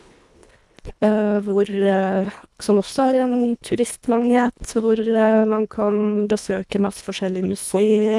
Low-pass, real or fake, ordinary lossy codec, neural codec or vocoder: none; fake; none; codec, 24 kHz, 1.5 kbps, HILCodec